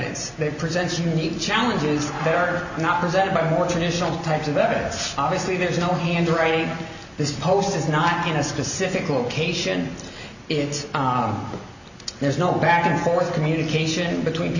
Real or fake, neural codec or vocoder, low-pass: real; none; 7.2 kHz